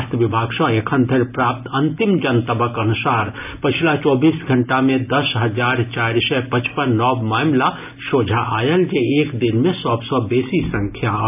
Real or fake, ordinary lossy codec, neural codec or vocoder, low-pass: real; AAC, 32 kbps; none; 3.6 kHz